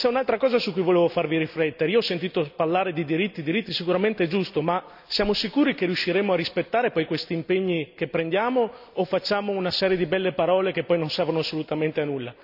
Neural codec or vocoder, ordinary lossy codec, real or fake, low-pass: none; none; real; 5.4 kHz